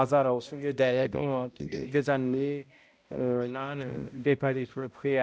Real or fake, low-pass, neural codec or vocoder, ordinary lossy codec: fake; none; codec, 16 kHz, 0.5 kbps, X-Codec, HuBERT features, trained on balanced general audio; none